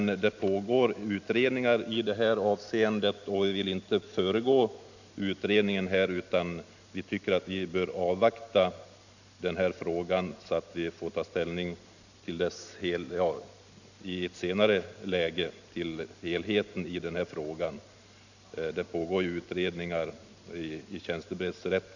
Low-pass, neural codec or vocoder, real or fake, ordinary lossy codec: 7.2 kHz; none; real; none